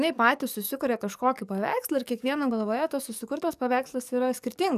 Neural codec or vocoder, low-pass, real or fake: codec, 44.1 kHz, 7.8 kbps, DAC; 14.4 kHz; fake